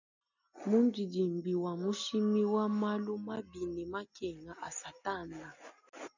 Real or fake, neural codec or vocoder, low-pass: real; none; 7.2 kHz